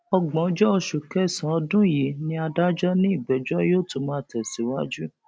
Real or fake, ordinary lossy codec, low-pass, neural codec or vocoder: real; none; none; none